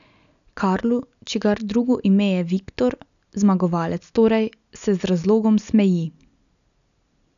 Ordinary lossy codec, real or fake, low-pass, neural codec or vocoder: none; real; 7.2 kHz; none